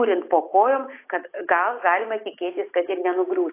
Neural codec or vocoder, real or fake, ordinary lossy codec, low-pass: none; real; AAC, 24 kbps; 3.6 kHz